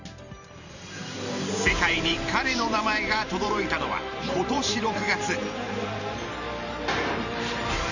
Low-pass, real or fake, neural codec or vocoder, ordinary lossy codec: 7.2 kHz; fake; vocoder, 44.1 kHz, 128 mel bands every 512 samples, BigVGAN v2; none